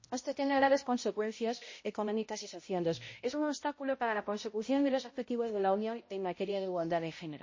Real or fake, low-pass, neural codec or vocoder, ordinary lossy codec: fake; 7.2 kHz; codec, 16 kHz, 0.5 kbps, X-Codec, HuBERT features, trained on balanced general audio; MP3, 32 kbps